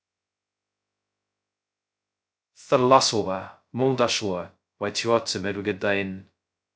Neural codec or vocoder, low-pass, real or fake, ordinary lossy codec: codec, 16 kHz, 0.2 kbps, FocalCodec; none; fake; none